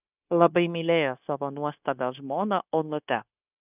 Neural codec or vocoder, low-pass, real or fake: codec, 16 kHz, 0.9 kbps, LongCat-Audio-Codec; 3.6 kHz; fake